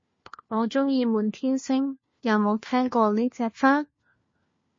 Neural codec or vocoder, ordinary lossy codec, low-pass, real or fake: codec, 16 kHz, 1 kbps, FunCodec, trained on LibriTTS, 50 frames a second; MP3, 32 kbps; 7.2 kHz; fake